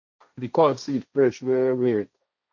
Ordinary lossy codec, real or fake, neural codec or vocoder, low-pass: none; fake; codec, 16 kHz, 1.1 kbps, Voila-Tokenizer; 7.2 kHz